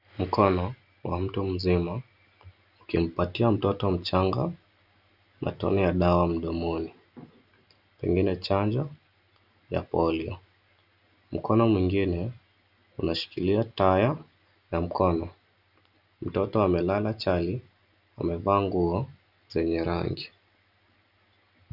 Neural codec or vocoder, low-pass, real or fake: none; 5.4 kHz; real